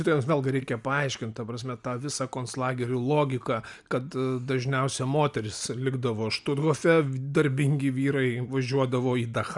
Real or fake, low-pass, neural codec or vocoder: real; 10.8 kHz; none